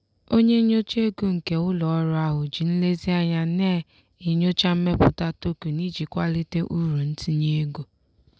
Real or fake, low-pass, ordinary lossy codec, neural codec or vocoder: real; none; none; none